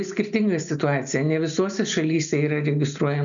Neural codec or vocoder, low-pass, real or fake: none; 7.2 kHz; real